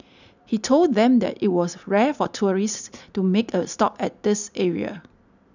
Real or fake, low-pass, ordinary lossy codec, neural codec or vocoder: real; 7.2 kHz; none; none